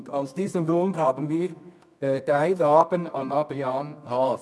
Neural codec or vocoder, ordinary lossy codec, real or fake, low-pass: codec, 24 kHz, 0.9 kbps, WavTokenizer, medium music audio release; none; fake; none